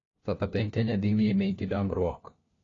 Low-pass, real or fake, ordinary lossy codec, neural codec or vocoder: 7.2 kHz; fake; AAC, 32 kbps; codec, 16 kHz, 1 kbps, FunCodec, trained on LibriTTS, 50 frames a second